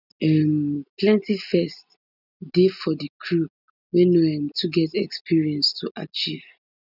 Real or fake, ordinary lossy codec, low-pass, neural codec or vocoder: real; none; 5.4 kHz; none